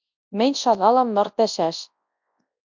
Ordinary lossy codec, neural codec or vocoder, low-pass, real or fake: MP3, 64 kbps; codec, 24 kHz, 0.9 kbps, WavTokenizer, large speech release; 7.2 kHz; fake